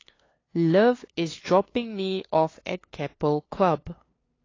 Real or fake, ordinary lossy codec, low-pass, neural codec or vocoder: fake; AAC, 32 kbps; 7.2 kHz; codec, 16 kHz, 2 kbps, FunCodec, trained on LibriTTS, 25 frames a second